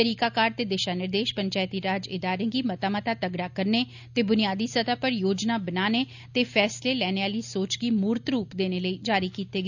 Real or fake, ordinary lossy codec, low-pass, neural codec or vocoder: real; none; 7.2 kHz; none